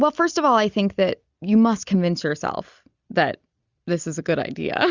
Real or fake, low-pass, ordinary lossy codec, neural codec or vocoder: real; 7.2 kHz; Opus, 64 kbps; none